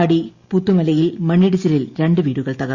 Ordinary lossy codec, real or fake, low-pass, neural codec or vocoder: Opus, 64 kbps; real; 7.2 kHz; none